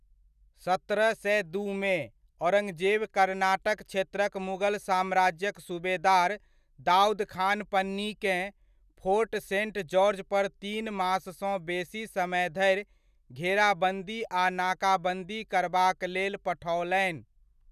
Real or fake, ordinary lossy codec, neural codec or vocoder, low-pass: real; none; none; 14.4 kHz